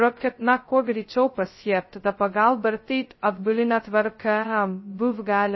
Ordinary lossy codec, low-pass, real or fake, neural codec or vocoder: MP3, 24 kbps; 7.2 kHz; fake; codec, 16 kHz, 0.2 kbps, FocalCodec